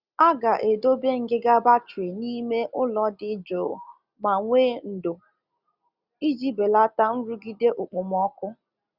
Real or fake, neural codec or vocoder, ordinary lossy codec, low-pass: real; none; Opus, 64 kbps; 5.4 kHz